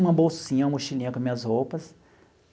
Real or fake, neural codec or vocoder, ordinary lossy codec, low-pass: real; none; none; none